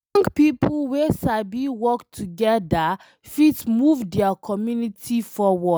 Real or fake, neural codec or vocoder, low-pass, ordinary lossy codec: real; none; none; none